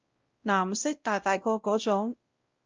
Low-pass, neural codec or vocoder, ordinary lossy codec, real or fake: 7.2 kHz; codec, 16 kHz, 0.5 kbps, X-Codec, WavLM features, trained on Multilingual LibriSpeech; Opus, 24 kbps; fake